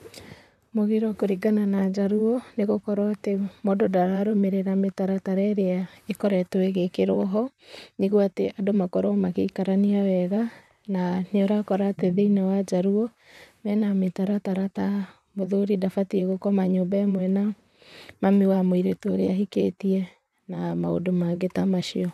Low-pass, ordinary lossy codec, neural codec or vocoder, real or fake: 14.4 kHz; none; vocoder, 44.1 kHz, 128 mel bands, Pupu-Vocoder; fake